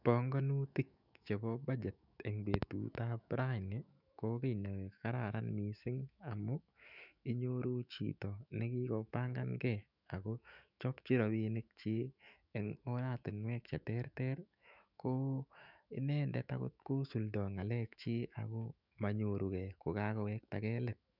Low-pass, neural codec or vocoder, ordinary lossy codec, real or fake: 5.4 kHz; autoencoder, 48 kHz, 128 numbers a frame, DAC-VAE, trained on Japanese speech; none; fake